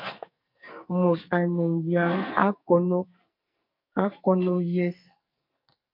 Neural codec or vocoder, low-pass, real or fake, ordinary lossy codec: codec, 44.1 kHz, 2.6 kbps, SNAC; 5.4 kHz; fake; MP3, 48 kbps